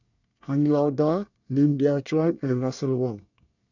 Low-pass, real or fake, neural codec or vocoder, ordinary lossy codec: 7.2 kHz; fake; codec, 24 kHz, 1 kbps, SNAC; none